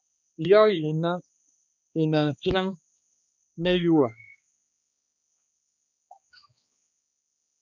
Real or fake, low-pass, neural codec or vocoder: fake; 7.2 kHz; codec, 16 kHz, 2 kbps, X-Codec, HuBERT features, trained on balanced general audio